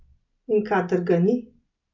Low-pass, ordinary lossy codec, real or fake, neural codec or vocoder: 7.2 kHz; none; real; none